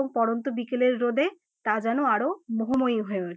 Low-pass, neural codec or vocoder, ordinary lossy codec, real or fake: none; none; none; real